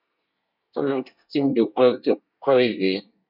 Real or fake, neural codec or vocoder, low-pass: fake; codec, 24 kHz, 1 kbps, SNAC; 5.4 kHz